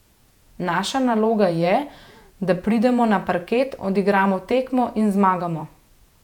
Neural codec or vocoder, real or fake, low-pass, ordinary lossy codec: vocoder, 48 kHz, 128 mel bands, Vocos; fake; 19.8 kHz; none